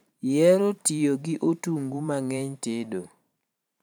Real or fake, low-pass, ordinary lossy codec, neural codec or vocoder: fake; none; none; vocoder, 44.1 kHz, 128 mel bands every 512 samples, BigVGAN v2